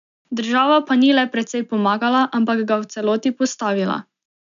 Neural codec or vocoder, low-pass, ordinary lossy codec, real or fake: none; 7.2 kHz; none; real